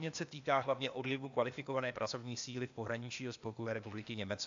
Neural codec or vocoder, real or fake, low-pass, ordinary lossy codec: codec, 16 kHz, 0.8 kbps, ZipCodec; fake; 7.2 kHz; AAC, 64 kbps